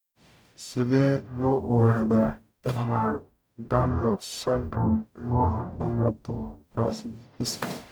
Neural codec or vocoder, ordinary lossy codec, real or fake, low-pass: codec, 44.1 kHz, 0.9 kbps, DAC; none; fake; none